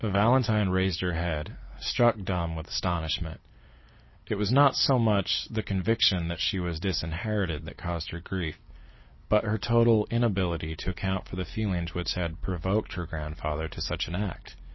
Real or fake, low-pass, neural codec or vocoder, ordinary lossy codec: real; 7.2 kHz; none; MP3, 24 kbps